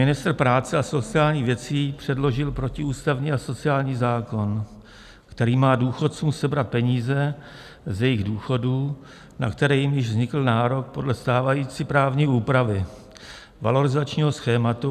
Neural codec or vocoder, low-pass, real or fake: none; 14.4 kHz; real